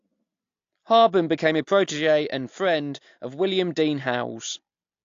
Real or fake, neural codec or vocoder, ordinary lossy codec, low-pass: real; none; AAC, 48 kbps; 7.2 kHz